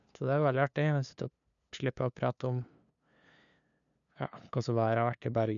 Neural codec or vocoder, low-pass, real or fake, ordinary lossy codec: codec, 16 kHz, 2 kbps, FunCodec, trained on LibriTTS, 25 frames a second; 7.2 kHz; fake; none